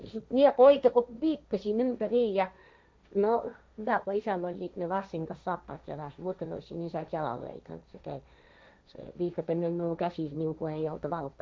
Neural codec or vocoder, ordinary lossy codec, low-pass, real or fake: codec, 16 kHz, 1.1 kbps, Voila-Tokenizer; none; none; fake